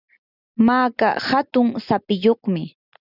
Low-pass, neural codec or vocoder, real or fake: 5.4 kHz; none; real